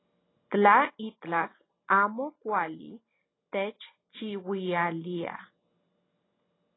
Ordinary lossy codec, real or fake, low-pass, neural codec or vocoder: AAC, 16 kbps; real; 7.2 kHz; none